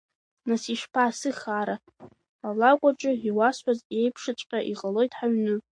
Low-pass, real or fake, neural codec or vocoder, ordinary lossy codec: 9.9 kHz; real; none; MP3, 48 kbps